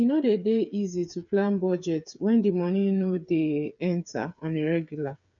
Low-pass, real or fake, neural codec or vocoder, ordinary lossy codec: 7.2 kHz; fake; codec, 16 kHz, 8 kbps, FreqCodec, smaller model; none